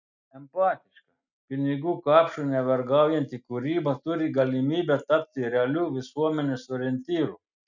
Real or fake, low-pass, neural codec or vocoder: real; 7.2 kHz; none